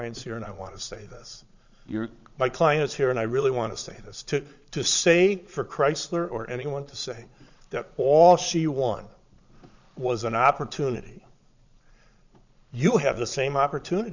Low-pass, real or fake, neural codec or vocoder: 7.2 kHz; fake; vocoder, 22.05 kHz, 80 mel bands, Vocos